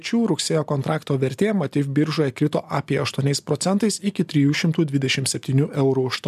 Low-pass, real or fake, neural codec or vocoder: 14.4 kHz; real; none